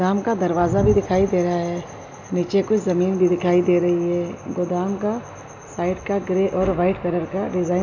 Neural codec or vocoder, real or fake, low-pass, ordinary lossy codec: none; real; 7.2 kHz; none